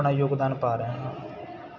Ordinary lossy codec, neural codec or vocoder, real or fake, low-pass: none; none; real; 7.2 kHz